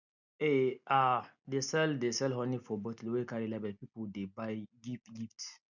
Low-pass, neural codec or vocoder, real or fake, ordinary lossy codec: 7.2 kHz; none; real; none